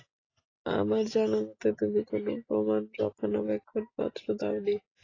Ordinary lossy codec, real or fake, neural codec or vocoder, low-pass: AAC, 32 kbps; real; none; 7.2 kHz